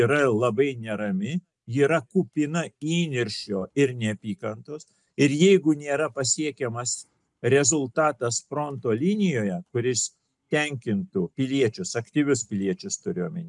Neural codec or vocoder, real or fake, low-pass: none; real; 10.8 kHz